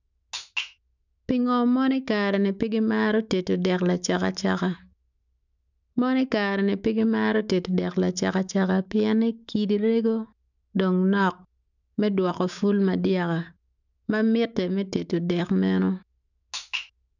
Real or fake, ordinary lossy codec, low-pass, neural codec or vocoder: fake; none; 7.2 kHz; autoencoder, 48 kHz, 128 numbers a frame, DAC-VAE, trained on Japanese speech